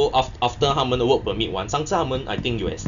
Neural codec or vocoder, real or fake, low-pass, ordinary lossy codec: none; real; 7.2 kHz; none